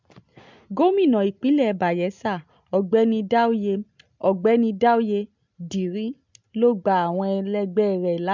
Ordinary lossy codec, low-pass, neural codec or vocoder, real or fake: MP3, 64 kbps; 7.2 kHz; none; real